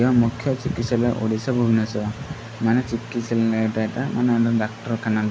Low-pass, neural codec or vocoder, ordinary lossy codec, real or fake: none; none; none; real